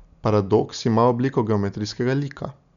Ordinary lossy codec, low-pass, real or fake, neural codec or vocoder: none; 7.2 kHz; real; none